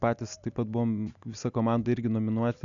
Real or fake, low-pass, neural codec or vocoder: real; 7.2 kHz; none